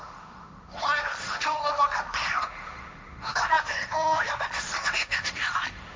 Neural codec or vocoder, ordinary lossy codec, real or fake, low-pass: codec, 16 kHz, 1.1 kbps, Voila-Tokenizer; none; fake; none